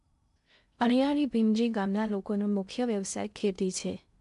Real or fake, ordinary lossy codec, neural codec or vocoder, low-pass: fake; MP3, 96 kbps; codec, 16 kHz in and 24 kHz out, 0.6 kbps, FocalCodec, streaming, 2048 codes; 10.8 kHz